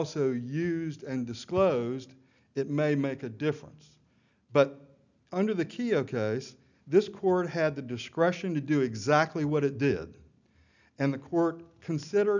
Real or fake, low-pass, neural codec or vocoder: real; 7.2 kHz; none